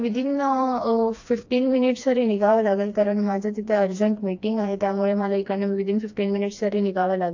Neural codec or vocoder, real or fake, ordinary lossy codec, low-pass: codec, 16 kHz, 2 kbps, FreqCodec, smaller model; fake; AAC, 48 kbps; 7.2 kHz